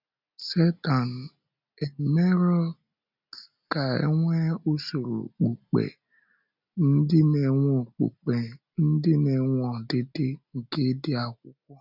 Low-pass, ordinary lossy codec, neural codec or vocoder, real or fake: 5.4 kHz; none; none; real